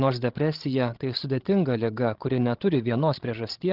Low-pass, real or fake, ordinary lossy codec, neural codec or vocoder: 5.4 kHz; fake; Opus, 16 kbps; codec, 16 kHz, 16 kbps, FreqCodec, larger model